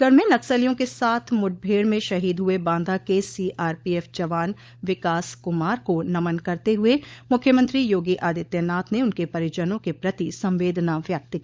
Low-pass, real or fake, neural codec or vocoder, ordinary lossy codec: none; fake; codec, 16 kHz, 16 kbps, FunCodec, trained on LibriTTS, 50 frames a second; none